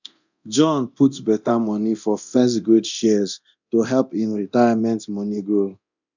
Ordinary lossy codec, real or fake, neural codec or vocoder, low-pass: none; fake; codec, 24 kHz, 0.9 kbps, DualCodec; 7.2 kHz